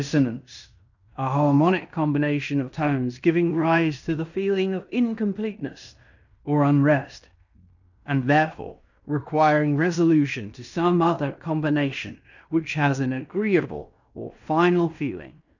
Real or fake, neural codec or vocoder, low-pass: fake; codec, 16 kHz in and 24 kHz out, 0.9 kbps, LongCat-Audio-Codec, fine tuned four codebook decoder; 7.2 kHz